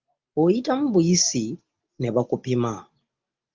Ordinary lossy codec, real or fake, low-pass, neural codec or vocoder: Opus, 32 kbps; real; 7.2 kHz; none